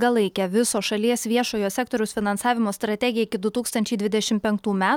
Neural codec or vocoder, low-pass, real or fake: none; 19.8 kHz; real